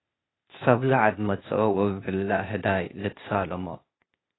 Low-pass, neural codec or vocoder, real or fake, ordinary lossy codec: 7.2 kHz; codec, 16 kHz, 0.8 kbps, ZipCodec; fake; AAC, 16 kbps